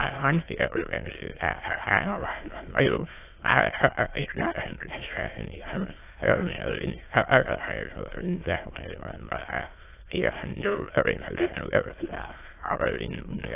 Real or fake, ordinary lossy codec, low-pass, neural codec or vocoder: fake; AAC, 24 kbps; 3.6 kHz; autoencoder, 22.05 kHz, a latent of 192 numbers a frame, VITS, trained on many speakers